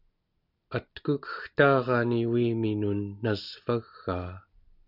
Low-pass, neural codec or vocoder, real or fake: 5.4 kHz; none; real